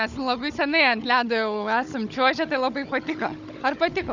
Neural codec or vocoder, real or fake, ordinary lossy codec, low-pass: codec, 16 kHz, 16 kbps, FunCodec, trained on Chinese and English, 50 frames a second; fake; Opus, 64 kbps; 7.2 kHz